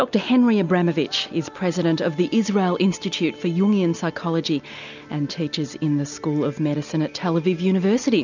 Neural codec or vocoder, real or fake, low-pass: none; real; 7.2 kHz